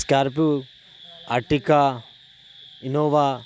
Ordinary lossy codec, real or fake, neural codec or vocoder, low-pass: none; real; none; none